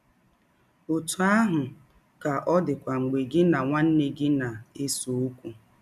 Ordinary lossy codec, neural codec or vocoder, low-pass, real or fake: none; none; 14.4 kHz; real